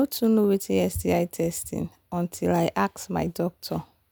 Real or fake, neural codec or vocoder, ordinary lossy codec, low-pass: real; none; none; none